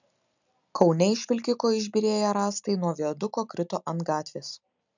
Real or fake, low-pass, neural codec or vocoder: real; 7.2 kHz; none